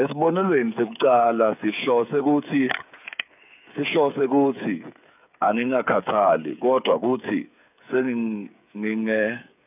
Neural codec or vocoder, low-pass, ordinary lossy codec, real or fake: codec, 16 kHz, 8 kbps, FreqCodec, smaller model; 3.6 kHz; none; fake